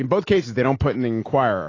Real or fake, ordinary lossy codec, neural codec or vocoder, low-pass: real; AAC, 32 kbps; none; 7.2 kHz